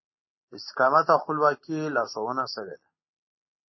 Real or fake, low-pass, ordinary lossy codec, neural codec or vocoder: real; 7.2 kHz; MP3, 24 kbps; none